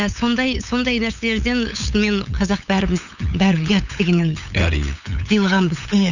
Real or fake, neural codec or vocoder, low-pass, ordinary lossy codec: fake; codec, 16 kHz, 8 kbps, FunCodec, trained on LibriTTS, 25 frames a second; 7.2 kHz; none